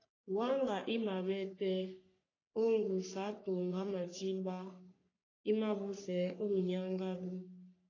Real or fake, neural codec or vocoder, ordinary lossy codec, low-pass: fake; codec, 44.1 kHz, 3.4 kbps, Pupu-Codec; AAC, 32 kbps; 7.2 kHz